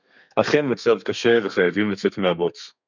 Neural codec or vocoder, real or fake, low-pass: codec, 32 kHz, 1.9 kbps, SNAC; fake; 7.2 kHz